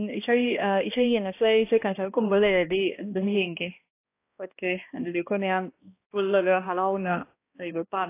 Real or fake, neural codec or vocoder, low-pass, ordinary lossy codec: fake; codec, 16 kHz, 1 kbps, X-Codec, HuBERT features, trained on balanced general audio; 3.6 kHz; AAC, 24 kbps